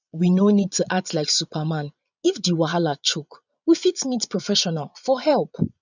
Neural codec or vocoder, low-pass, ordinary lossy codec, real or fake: vocoder, 22.05 kHz, 80 mel bands, Vocos; 7.2 kHz; none; fake